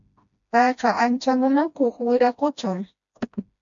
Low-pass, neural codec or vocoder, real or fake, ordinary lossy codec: 7.2 kHz; codec, 16 kHz, 1 kbps, FreqCodec, smaller model; fake; MP3, 64 kbps